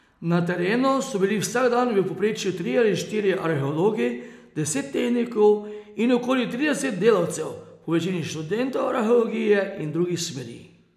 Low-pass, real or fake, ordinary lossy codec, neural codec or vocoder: 14.4 kHz; real; none; none